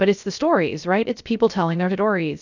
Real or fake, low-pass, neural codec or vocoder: fake; 7.2 kHz; codec, 16 kHz, 0.3 kbps, FocalCodec